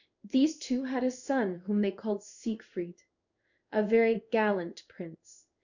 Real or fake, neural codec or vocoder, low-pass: fake; codec, 16 kHz in and 24 kHz out, 1 kbps, XY-Tokenizer; 7.2 kHz